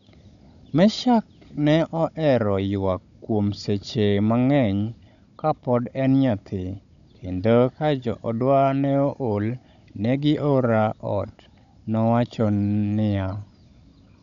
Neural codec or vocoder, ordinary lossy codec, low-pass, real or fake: codec, 16 kHz, 16 kbps, FunCodec, trained on Chinese and English, 50 frames a second; none; 7.2 kHz; fake